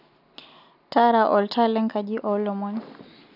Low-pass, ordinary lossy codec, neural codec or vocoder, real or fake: 5.4 kHz; none; none; real